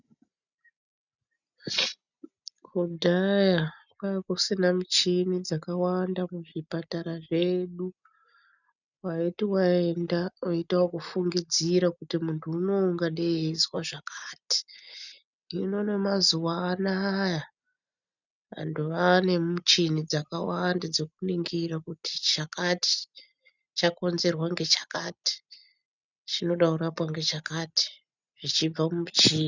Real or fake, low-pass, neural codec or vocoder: real; 7.2 kHz; none